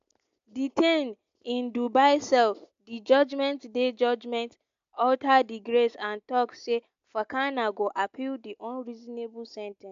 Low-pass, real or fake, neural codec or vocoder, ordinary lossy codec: 7.2 kHz; real; none; AAC, 64 kbps